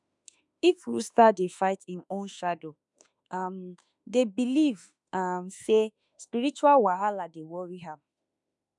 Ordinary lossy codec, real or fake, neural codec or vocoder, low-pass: none; fake; autoencoder, 48 kHz, 32 numbers a frame, DAC-VAE, trained on Japanese speech; 10.8 kHz